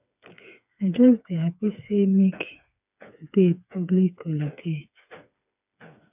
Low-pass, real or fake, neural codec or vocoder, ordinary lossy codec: 3.6 kHz; fake; codec, 16 kHz, 4 kbps, FreqCodec, smaller model; none